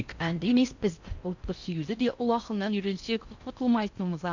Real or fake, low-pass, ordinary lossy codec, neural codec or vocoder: fake; 7.2 kHz; Opus, 64 kbps; codec, 16 kHz in and 24 kHz out, 0.6 kbps, FocalCodec, streaming, 4096 codes